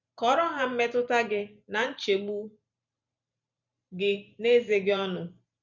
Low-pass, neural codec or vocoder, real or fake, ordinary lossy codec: 7.2 kHz; vocoder, 44.1 kHz, 128 mel bands every 512 samples, BigVGAN v2; fake; none